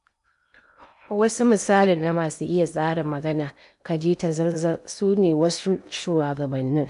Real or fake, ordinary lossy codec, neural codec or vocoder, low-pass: fake; none; codec, 16 kHz in and 24 kHz out, 0.8 kbps, FocalCodec, streaming, 65536 codes; 10.8 kHz